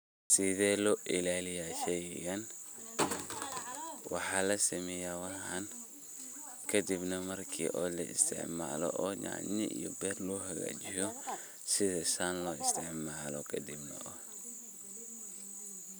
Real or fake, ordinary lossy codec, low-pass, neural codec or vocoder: real; none; none; none